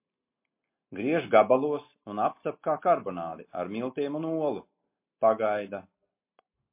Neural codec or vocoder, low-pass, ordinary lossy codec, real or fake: none; 3.6 kHz; MP3, 24 kbps; real